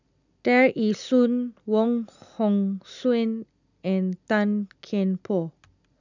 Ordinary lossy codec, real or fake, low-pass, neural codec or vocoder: none; real; 7.2 kHz; none